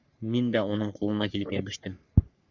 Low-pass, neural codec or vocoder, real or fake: 7.2 kHz; codec, 44.1 kHz, 3.4 kbps, Pupu-Codec; fake